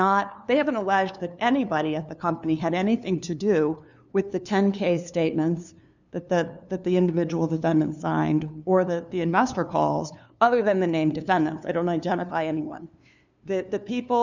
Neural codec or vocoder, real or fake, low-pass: codec, 16 kHz, 2 kbps, FunCodec, trained on LibriTTS, 25 frames a second; fake; 7.2 kHz